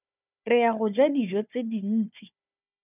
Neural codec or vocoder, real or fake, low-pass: codec, 16 kHz, 4 kbps, FunCodec, trained on Chinese and English, 50 frames a second; fake; 3.6 kHz